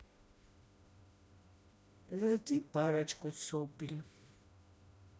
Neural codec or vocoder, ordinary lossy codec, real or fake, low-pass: codec, 16 kHz, 1 kbps, FreqCodec, smaller model; none; fake; none